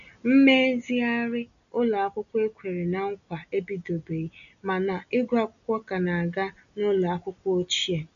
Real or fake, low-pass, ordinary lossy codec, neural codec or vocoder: real; 7.2 kHz; none; none